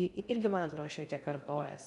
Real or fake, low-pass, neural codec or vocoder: fake; 10.8 kHz; codec, 16 kHz in and 24 kHz out, 0.6 kbps, FocalCodec, streaming, 2048 codes